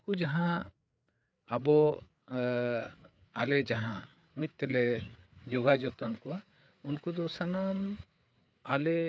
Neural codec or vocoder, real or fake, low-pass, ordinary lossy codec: codec, 16 kHz, 4 kbps, FreqCodec, larger model; fake; none; none